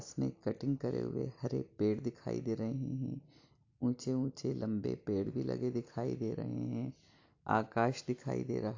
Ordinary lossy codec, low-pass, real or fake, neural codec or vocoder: AAC, 48 kbps; 7.2 kHz; real; none